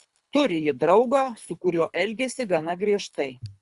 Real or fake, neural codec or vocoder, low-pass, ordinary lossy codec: fake; codec, 24 kHz, 3 kbps, HILCodec; 10.8 kHz; Opus, 64 kbps